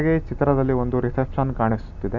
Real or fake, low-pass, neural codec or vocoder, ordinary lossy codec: real; 7.2 kHz; none; none